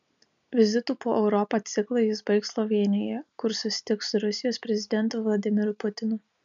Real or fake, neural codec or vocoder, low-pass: real; none; 7.2 kHz